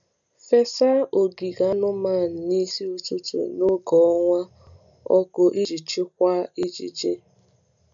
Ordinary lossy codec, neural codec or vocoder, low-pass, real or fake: none; none; 7.2 kHz; real